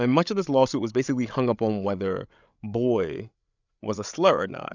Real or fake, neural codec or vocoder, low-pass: fake; codec, 16 kHz, 8 kbps, FreqCodec, larger model; 7.2 kHz